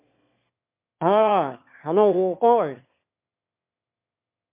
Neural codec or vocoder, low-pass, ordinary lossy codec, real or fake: autoencoder, 22.05 kHz, a latent of 192 numbers a frame, VITS, trained on one speaker; 3.6 kHz; MP3, 32 kbps; fake